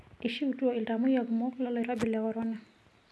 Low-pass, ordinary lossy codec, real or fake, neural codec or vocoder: none; none; real; none